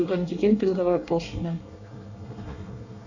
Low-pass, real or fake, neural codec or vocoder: 7.2 kHz; fake; codec, 24 kHz, 1 kbps, SNAC